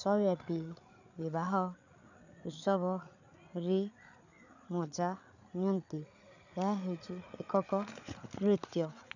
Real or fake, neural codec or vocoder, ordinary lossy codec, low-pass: fake; codec, 16 kHz, 8 kbps, FreqCodec, larger model; none; 7.2 kHz